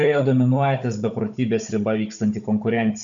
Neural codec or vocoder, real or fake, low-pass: codec, 16 kHz, 16 kbps, FunCodec, trained on Chinese and English, 50 frames a second; fake; 7.2 kHz